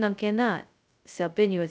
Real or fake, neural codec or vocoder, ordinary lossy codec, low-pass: fake; codec, 16 kHz, 0.2 kbps, FocalCodec; none; none